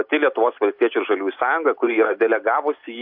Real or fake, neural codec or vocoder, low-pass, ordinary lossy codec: real; none; 5.4 kHz; MP3, 48 kbps